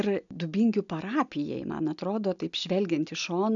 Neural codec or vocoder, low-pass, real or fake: none; 7.2 kHz; real